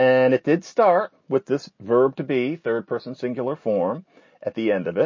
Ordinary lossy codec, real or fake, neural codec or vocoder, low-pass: MP3, 32 kbps; real; none; 7.2 kHz